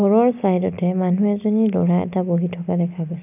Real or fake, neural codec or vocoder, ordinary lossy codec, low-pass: real; none; none; 3.6 kHz